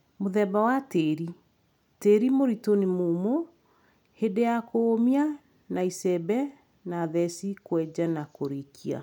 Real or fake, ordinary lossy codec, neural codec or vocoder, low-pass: real; none; none; 19.8 kHz